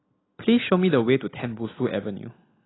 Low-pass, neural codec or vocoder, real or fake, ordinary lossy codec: 7.2 kHz; none; real; AAC, 16 kbps